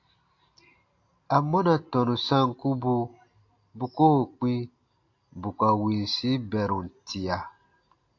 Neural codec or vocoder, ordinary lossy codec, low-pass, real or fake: none; AAC, 48 kbps; 7.2 kHz; real